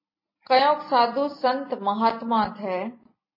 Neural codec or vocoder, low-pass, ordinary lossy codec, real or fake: none; 5.4 kHz; MP3, 24 kbps; real